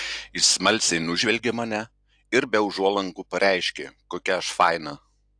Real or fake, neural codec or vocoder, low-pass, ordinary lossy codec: real; none; 9.9 kHz; AAC, 64 kbps